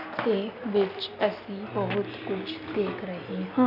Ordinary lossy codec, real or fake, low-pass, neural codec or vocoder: none; real; 5.4 kHz; none